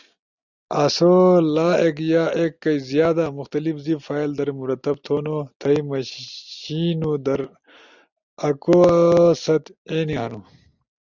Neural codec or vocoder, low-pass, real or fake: none; 7.2 kHz; real